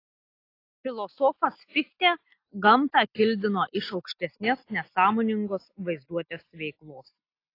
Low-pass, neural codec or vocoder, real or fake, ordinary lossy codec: 5.4 kHz; none; real; AAC, 32 kbps